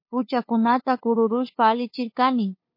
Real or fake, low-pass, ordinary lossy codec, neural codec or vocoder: fake; 5.4 kHz; MP3, 32 kbps; codec, 16 kHz, 2 kbps, FunCodec, trained on LibriTTS, 25 frames a second